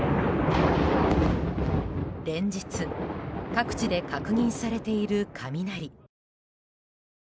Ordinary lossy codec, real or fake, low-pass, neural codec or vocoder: none; real; none; none